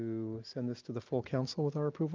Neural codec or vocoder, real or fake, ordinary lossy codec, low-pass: none; real; Opus, 24 kbps; 7.2 kHz